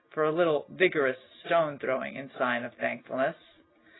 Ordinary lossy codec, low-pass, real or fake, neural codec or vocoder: AAC, 16 kbps; 7.2 kHz; real; none